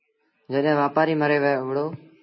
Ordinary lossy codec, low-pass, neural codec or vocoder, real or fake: MP3, 24 kbps; 7.2 kHz; none; real